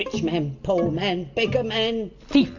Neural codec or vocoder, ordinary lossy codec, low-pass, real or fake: none; AAC, 48 kbps; 7.2 kHz; real